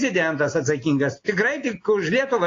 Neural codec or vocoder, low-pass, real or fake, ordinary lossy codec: none; 7.2 kHz; real; AAC, 32 kbps